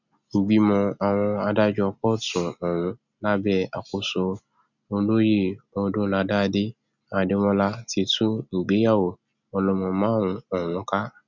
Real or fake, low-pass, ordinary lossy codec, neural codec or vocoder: real; 7.2 kHz; none; none